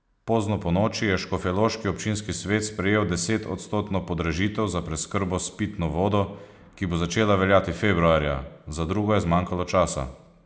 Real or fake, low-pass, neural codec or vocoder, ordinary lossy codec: real; none; none; none